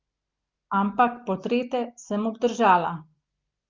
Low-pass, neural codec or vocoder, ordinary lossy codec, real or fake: 7.2 kHz; none; Opus, 32 kbps; real